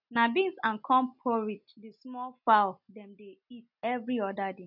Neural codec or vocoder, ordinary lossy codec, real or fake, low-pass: none; none; real; 5.4 kHz